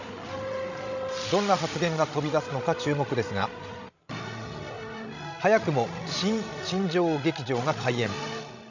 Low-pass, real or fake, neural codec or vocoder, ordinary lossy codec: 7.2 kHz; fake; codec, 16 kHz, 16 kbps, FreqCodec, larger model; none